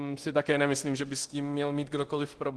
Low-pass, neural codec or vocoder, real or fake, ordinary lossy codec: 10.8 kHz; codec, 24 kHz, 0.9 kbps, DualCodec; fake; Opus, 16 kbps